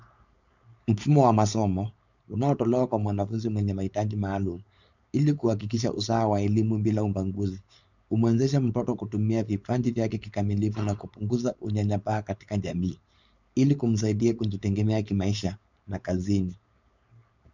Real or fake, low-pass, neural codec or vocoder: fake; 7.2 kHz; codec, 16 kHz, 4.8 kbps, FACodec